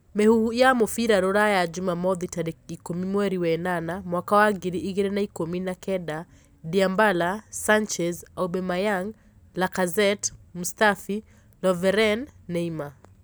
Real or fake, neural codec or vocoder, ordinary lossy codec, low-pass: real; none; none; none